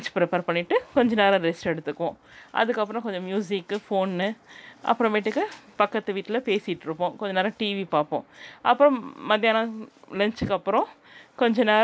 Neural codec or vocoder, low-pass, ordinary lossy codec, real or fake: none; none; none; real